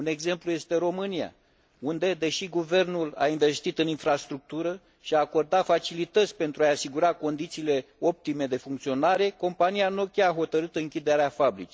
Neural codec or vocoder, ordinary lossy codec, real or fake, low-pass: none; none; real; none